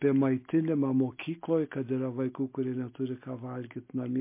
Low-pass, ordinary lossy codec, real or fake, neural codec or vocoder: 3.6 kHz; MP3, 24 kbps; real; none